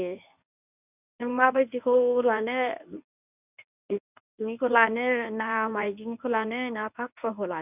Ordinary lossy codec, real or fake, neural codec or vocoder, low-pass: none; fake; codec, 24 kHz, 0.9 kbps, WavTokenizer, medium speech release version 1; 3.6 kHz